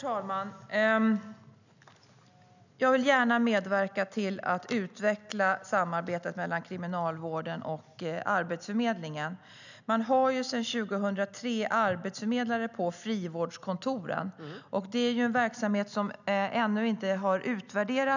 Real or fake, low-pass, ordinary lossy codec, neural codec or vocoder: real; 7.2 kHz; none; none